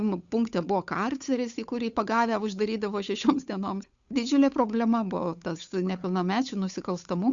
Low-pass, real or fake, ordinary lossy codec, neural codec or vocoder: 7.2 kHz; fake; Opus, 64 kbps; codec, 16 kHz, 8 kbps, FunCodec, trained on Chinese and English, 25 frames a second